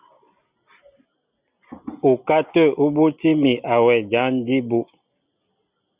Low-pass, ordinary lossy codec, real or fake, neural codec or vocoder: 3.6 kHz; Opus, 64 kbps; real; none